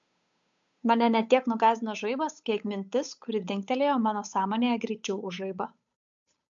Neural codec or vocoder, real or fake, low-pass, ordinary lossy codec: codec, 16 kHz, 8 kbps, FunCodec, trained on Chinese and English, 25 frames a second; fake; 7.2 kHz; MP3, 64 kbps